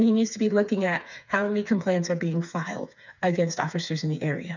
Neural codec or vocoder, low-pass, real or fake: codec, 16 kHz, 4 kbps, FreqCodec, smaller model; 7.2 kHz; fake